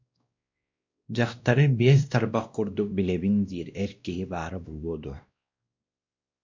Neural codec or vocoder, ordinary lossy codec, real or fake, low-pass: codec, 16 kHz, 1 kbps, X-Codec, WavLM features, trained on Multilingual LibriSpeech; MP3, 64 kbps; fake; 7.2 kHz